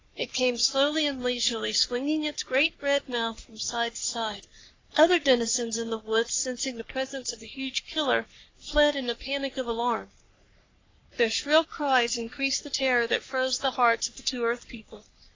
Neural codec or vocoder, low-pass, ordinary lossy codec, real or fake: codec, 44.1 kHz, 3.4 kbps, Pupu-Codec; 7.2 kHz; AAC, 32 kbps; fake